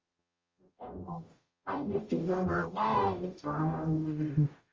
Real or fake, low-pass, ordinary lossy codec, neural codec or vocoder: fake; 7.2 kHz; Opus, 32 kbps; codec, 44.1 kHz, 0.9 kbps, DAC